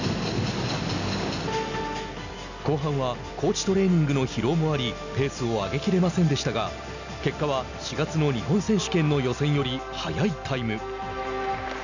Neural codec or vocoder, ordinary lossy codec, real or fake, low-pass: none; none; real; 7.2 kHz